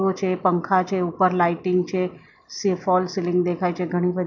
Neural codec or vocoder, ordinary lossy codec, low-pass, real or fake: none; none; 7.2 kHz; real